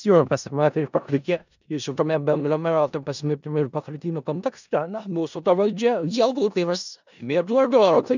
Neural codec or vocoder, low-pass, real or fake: codec, 16 kHz in and 24 kHz out, 0.4 kbps, LongCat-Audio-Codec, four codebook decoder; 7.2 kHz; fake